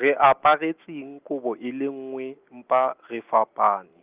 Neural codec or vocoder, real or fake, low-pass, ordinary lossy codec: none; real; 3.6 kHz; Opus, 32 kbps